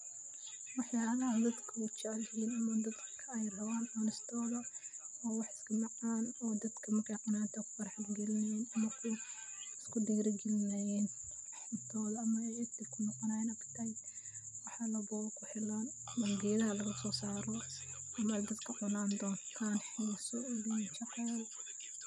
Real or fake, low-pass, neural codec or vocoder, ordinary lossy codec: real; none; none; none